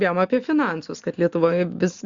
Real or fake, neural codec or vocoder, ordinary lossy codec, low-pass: real; none; Opus, 64 kbps; 7.2 kHz